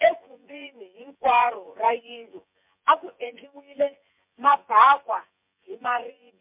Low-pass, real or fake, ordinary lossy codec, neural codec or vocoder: 3.6 kHz; fake; MP3, 32 kbps; vocoder, 24 kHz, 100 mel bands, Vocos